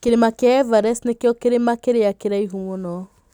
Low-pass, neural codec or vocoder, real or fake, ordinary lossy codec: 19.8 kHz; none; real; none